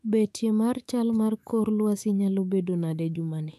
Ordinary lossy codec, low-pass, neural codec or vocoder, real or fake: none; 14.4 kHz; autoencoder, 48 kHz, 128 numbers a frame, DAC-VAE, trained on Japanese speech; fake